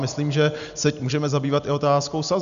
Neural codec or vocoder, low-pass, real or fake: none; 7.2 kHz; real